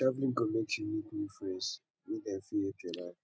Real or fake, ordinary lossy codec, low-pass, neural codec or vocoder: real; none; none; none